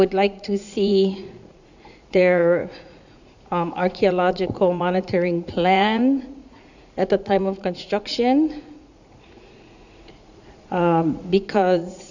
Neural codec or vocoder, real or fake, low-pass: vocoder, 44.1 kHz, 80 mel bands, Vocos; fake; 7.2 kHz